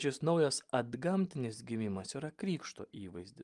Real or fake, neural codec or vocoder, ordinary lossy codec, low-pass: real; none; Opus, 32 kbps; 10.8 kHz